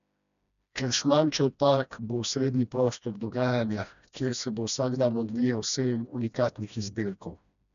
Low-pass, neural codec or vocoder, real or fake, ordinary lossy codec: 7.2 kHz; codec, 16 kHz, 1 kbps, FreqCodec, smaller model; fake; none